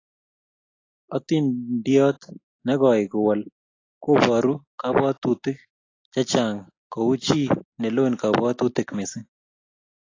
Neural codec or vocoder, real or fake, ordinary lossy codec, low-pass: none; real; AAC, 32 kbps; 7.2 kHz